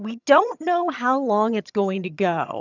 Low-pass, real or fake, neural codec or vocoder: 7.2 kHz; fake; vocoder, 22.05 kHz, 80 mel bands, HiFi-GAN